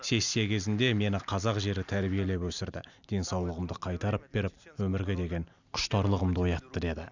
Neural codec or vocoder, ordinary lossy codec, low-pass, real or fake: none; none; 7.2 kHz; real